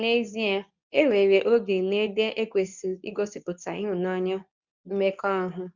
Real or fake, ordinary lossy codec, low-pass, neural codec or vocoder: fake; none; 7.2 kHz; codec, 24 kHz, 0.9 kbps, WavTokenizer, medium speech release version 2